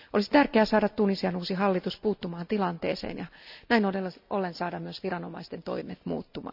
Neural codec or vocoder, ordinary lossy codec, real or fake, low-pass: none; none; real; 5.4 kHz